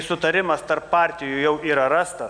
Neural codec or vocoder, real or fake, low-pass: none; real; 9.9 kHz